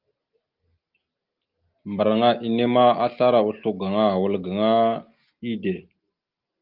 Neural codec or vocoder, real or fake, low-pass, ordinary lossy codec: none; real; 5.4 kHz; Opus, 32 kbps